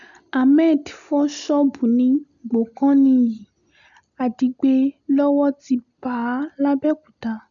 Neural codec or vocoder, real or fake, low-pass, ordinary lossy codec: none; real; 7.2 kHz; none